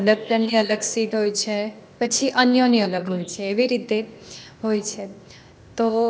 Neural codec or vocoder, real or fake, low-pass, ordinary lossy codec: codec, 16 kHz, 0.8 kbps, ZipCodec; fake; none; none